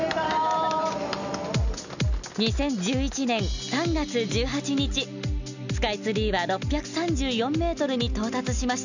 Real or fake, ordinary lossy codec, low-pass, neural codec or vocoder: real; none; 7.2 kHz; none